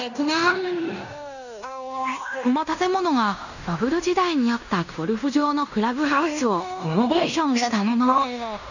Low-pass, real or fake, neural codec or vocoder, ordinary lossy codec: 7.2 kHz; fake; codec, 16 kHz in and 24 kHz out, 0.9 kbps, LongCat-Audio-Codec, fine tuned four codebook decoder; none